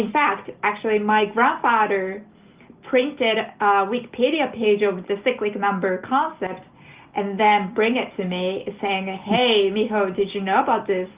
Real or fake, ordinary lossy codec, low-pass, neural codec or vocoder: real; Opus, 32 kbps; 3.6 kHz; none